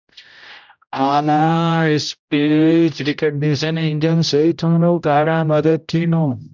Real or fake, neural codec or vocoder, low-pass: fake; codec, 16 kHz, 0.5 kbps, X-Codec, HuBERT features, trained on general audio; 7.2 kHz